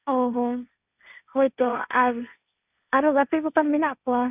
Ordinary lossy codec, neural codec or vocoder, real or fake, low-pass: none; codec, 16 kHz, 1.1 kbps, Voila-Tokenizer; fake; 3.6 kHz